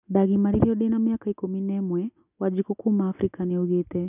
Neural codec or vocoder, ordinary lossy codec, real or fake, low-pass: none; none; real; 3.6 kHz